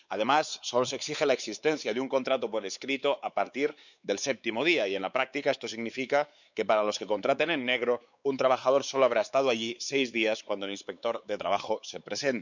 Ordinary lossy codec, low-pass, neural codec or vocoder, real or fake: none; 7.2 kHz; codec, 16 kHz, 4 kbps, X-Codec, WavLM features, trained on Multilingual LibriSpeech; fake